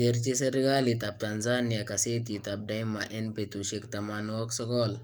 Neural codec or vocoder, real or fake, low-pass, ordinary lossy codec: codec, 44.1 kHz, 7.8 kbps, DAC; fake; none; none